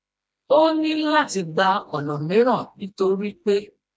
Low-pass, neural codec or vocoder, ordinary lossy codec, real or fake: none; codec, 16 kHz, 1 kbps, FreqCodec, smaller model; none; fake